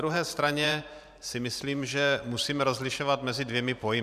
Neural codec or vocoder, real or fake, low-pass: vocoder, 44.1 kHz, 128 mel bands every 512 samples, BigVGAN v2; fake; 14.4 kHz